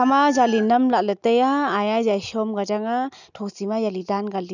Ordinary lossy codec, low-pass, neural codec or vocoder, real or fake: none; 7.2 kHz; none; real